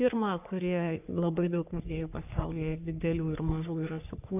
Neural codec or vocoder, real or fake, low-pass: codec, 44.1 kHz, 3.4 kbps, Pupu-Codec; fake; 3.6 kHz